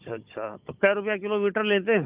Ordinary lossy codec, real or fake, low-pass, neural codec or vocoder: Opus, 32 kbps; fake; 3.6 kHz; codec, 16 kHz, 16 kbps, FunCodec, trained on Chinese and English, 50 frames a second